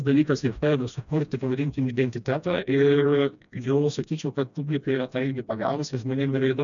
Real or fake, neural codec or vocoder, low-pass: fake; codec, 16 kHz, 1 kbps, FreqCodec, smaller model; 7.2 kHz